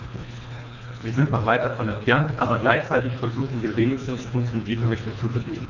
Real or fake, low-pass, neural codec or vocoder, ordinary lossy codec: fake; 7.2 kHz; codec, 24 kHz, 1.5 kbps, HILCodec; none